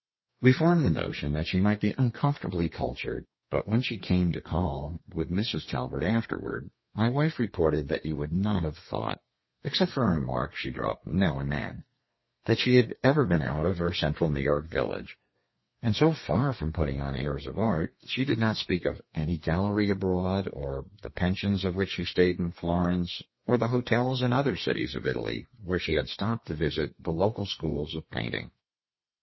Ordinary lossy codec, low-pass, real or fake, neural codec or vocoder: MP3, 24 kbps; 7.2 kHz; fake; codec, 32 kHz, 1.9 kbps, SNAC